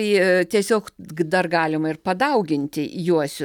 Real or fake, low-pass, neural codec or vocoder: real; 19.8 kHz; none